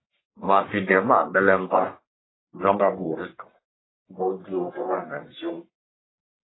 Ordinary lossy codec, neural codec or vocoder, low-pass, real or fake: AAC, 16 kbps; codec, 44.1 kHz, 1.7 kbps, Pupu-Codec; 7.2 kHz; fake